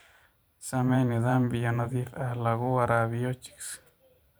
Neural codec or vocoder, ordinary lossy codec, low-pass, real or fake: vocoder, 44.1 kHz, 128 mel bands every 512 samples, BigVGAN v2; none; none; fake